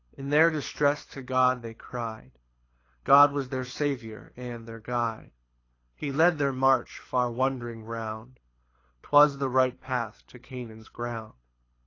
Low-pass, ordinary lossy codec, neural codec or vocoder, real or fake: 7.2 kHz; AAC, 32 kbps; codec, 24 kHz, 6 kbps, HILCodec; fake